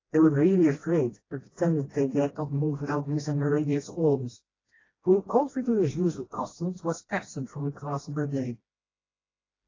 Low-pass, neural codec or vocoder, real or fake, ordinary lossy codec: 7.2 kHz; codec, 16 kHz, 1 kbps, FreqCodec, smaller model; fake; AAC, 32 kbps